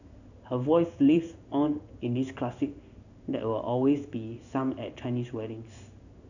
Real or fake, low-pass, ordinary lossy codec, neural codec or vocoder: fake; 7.2 kHz; none; codec, 16 kHz in and 24 kHz out, 1 kbps, XY-Tokenizer